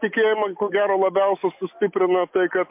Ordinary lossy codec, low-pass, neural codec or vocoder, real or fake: MP3, 32 kbps; 3.6 kHz; none; real